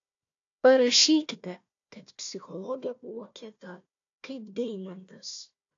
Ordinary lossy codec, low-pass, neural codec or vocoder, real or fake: AAC, 48 kbps; 7.2 kHz; codec, 16 kHz, 1 kbps, FunCodec, trained on Chinese and English, 50 frames a second; fake